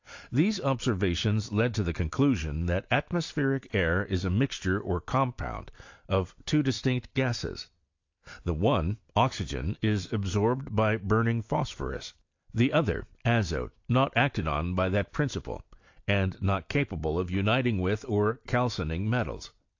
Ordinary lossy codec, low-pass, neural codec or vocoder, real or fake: AAC, 48 kbps; 7.2 kHz; none; real